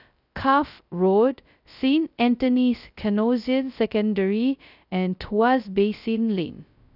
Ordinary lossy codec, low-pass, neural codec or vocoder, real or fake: none; 5.4 kHz; codec, 16 kHz, 0.2 kbps, FocalCodec; fake